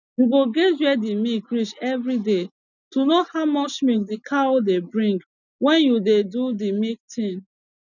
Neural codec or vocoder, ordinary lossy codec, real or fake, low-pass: none; none; real; 7.2 kHz